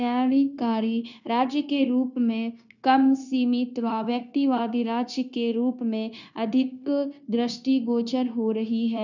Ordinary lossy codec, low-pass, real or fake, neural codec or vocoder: none; 7.2 kHz; fake; codec, 16 kHz, 0.9 kbps, LongCat-Audio-Codec